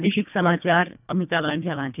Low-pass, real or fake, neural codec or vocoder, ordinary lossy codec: 3.6 kHz; fake; codec, 24 kHz, 1.5 kbps, HILCodec; none